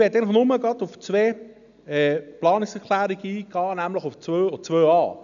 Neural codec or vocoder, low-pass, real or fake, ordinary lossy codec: none; 7.2 kHz; real; none